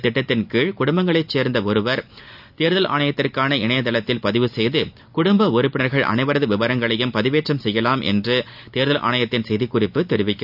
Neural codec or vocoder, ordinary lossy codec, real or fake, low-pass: none; none; real; 5.4 kHz